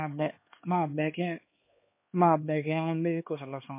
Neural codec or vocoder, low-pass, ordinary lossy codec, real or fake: codec, 16 kHz, 2 kbps, X-Codec, HuBERT features, trained on LibriSpeech; 3.6 kHz; MP3, 24 kbps; fake